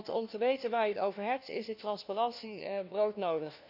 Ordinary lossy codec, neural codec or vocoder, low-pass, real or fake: none; codec, 16 kHz, 1 kbps, FunCodec, trained on LibriTTS, 50 frames a second; 5.4 kHz; fake